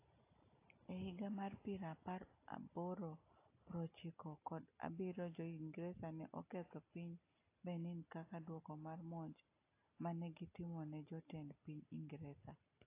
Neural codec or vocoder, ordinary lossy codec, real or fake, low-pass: none; AAC, 24 kbps; real; 3.6 kHz